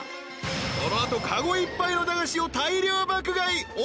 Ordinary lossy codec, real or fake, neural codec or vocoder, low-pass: none; real; none; none